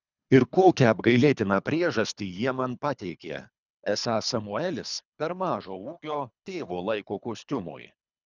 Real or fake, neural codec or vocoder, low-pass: fake; codec, 24 kHz, 3 kbps, HILCodec; 7.2 kHz